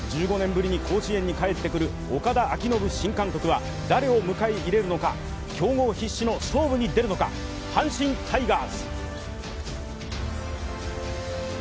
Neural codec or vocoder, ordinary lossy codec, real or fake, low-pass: none; none; real; none